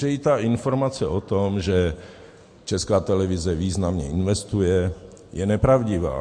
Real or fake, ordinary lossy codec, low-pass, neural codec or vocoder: real; MP3, 48 kbps; 9.9 kHz; none